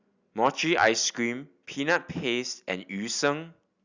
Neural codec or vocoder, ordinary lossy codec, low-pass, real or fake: none; Opus, 64 kbps; 7.2 kHz; real